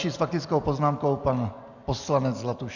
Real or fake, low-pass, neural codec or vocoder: real; 7.2 kHz; none